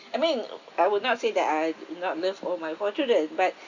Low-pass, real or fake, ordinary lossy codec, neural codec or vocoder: 7.2 kHz; real; none; none